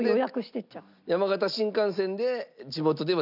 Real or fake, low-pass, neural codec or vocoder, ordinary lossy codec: real; 5.4 kHz; none; none